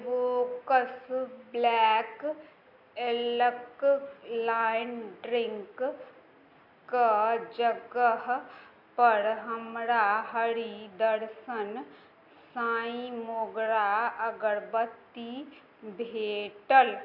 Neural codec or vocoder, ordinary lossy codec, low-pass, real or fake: none; none; 5.4 kHz; real